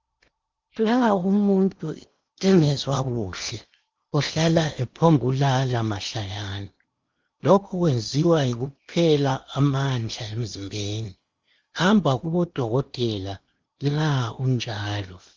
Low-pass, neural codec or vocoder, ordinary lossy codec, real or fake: 7.2 kHz; codec, 16 kHz in and 24 kHz out, 0.8 kbps, FocalCodec, streaming, 65536 codes; Opus, 32 kbps; fake